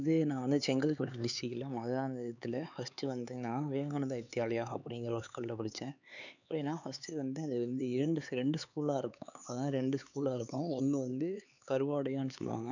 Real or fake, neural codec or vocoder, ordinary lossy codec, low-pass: fake; codec, 16 kHz, 4 kbps, X-Codec, HuBERT features, trained on LibriSpeech; none; 7.2 kHz